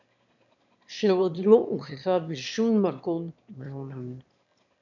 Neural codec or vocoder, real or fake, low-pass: autoencoder, 22.05 kHz, a latent of 192 numbers a frame, VITS, trained on one speaker; fake; 7.2 kHz